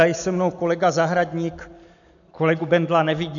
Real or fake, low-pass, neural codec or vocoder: real; 7.2 kHz; none